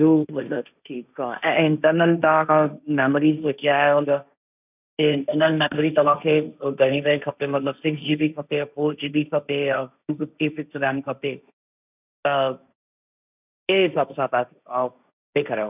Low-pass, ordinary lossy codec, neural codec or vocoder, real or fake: 3.6 kHz; none; codec, 16 kHz, 1.1 kbps, Voila-Tokenizer; fake